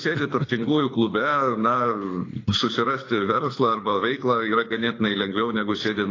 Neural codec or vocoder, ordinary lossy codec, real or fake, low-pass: codec, 24 kHz, 6 kbps, HILCodec; AAC, 32 kbps; fake; 7.2 kHz